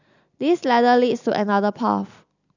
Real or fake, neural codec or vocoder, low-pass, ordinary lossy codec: real; none; 7.2 kHz; none